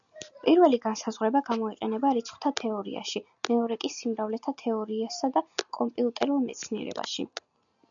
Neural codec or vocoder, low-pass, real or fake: none; 7.2 kHz; real